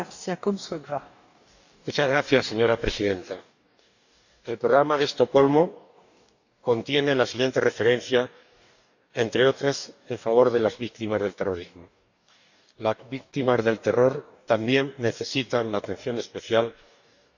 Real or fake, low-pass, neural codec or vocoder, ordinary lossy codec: fake; 7.2 kHz; codec, 44.1 kHz, 2.6 kbps, DAC; none